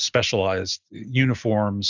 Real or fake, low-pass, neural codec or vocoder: real; 7.2 kHz; none